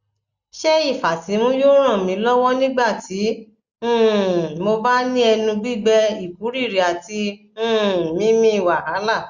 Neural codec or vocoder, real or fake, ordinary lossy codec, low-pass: none; real; Opus, 64 kbps; 7.2 kHz